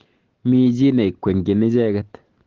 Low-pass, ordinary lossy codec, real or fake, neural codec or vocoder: 7.2 kHz; Opus, 16 kbps; real; none